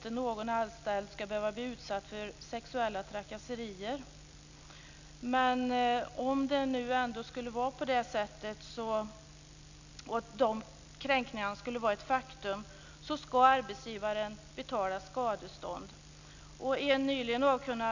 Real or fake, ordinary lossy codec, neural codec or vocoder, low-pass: real; none; none; 7.2 kHz